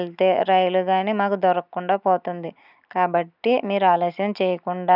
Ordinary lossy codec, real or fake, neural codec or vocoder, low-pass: none; real; none; 5.4 kHz